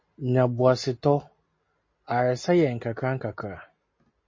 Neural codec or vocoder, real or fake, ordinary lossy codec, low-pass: none; real; MP3, 32 kbps; 7.2 kHz